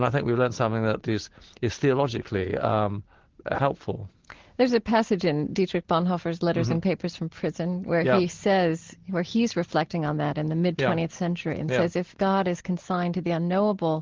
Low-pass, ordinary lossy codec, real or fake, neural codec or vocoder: 7.2 kHz; Opus, 16 kbps; real; none